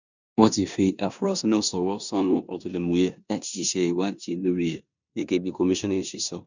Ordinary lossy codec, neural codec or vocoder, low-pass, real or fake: none; codec, 16 kHz in and 24 kHz out, 0.9 kbps, LongCat-Audio-Codec, four codebook decoder; 7.2 kHz; fake